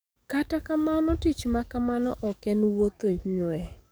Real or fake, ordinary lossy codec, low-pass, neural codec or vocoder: fake; none; none; codec, 44.1 kHz, 7.8 kbps, DAC